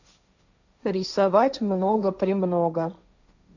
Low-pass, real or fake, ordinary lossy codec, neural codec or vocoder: 7.2 kHz; fake; none; codec, 16 kHz, 1.1 kbps, Voila-Tokenizer